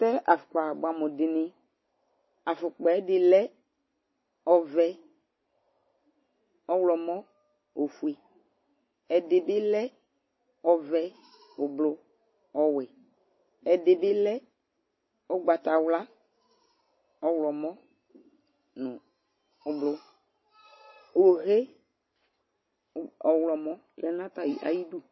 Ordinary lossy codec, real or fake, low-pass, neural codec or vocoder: MP3, 24 kbps; real; 7.2 kHz; none